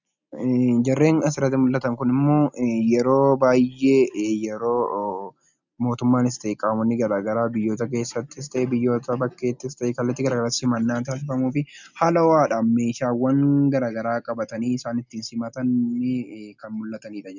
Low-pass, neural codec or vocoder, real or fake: 7.2 kHz; none; real